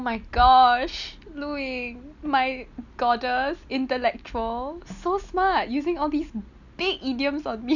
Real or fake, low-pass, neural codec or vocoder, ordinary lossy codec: real; 7.2 kHz; none; none